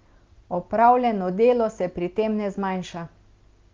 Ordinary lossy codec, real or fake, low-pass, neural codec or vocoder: Opus, 24 kbps; real; 7.2 kHz; none